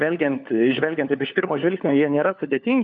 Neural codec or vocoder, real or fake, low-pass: codec, 16 kHz, 4 kbps, FunCodec, trained on LibriTTS, 50 frames a second; fake; 7.2 kHz